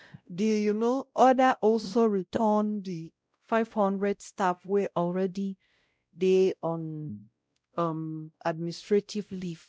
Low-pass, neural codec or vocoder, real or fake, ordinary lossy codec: none; codec, 16 kHz, 0.5 kbps, X-Codec, WavLM features, trained on Multilingual LibriSpeech; fake; none